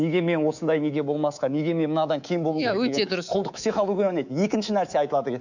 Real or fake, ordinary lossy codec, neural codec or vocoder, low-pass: fake; none; codec, 24 kHz, 3.1 kbps, DualCodec; 7.2 kHz